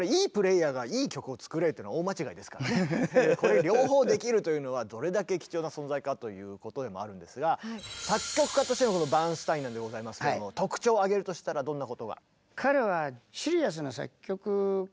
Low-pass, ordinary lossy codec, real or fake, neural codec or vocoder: none; none; real; none